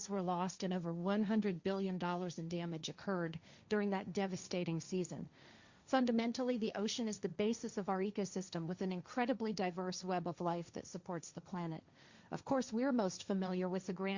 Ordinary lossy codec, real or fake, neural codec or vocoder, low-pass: Opus, 64 kbps; fake; codec, 16 kHz, 1.1 kbps, Voila-Tokenizer; 7.2 kHz